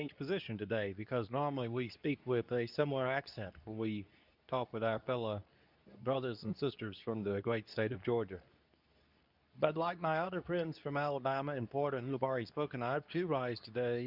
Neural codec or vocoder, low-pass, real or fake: codec, 24 kHz, 0.9 kbps, WavTokenizer, medium speech release version 2; 5.4 kHz; fake